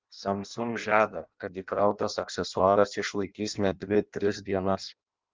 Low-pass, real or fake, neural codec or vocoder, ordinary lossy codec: 7.2 kHz; fake; codec, 16 kHz in and 24 kHz out, 0.6 kbps, FireRedTTS-2 codec; Opus, 32 kbps